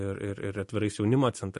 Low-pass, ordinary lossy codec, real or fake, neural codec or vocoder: 14.4 kHz; MP3, 48 kbps; real; none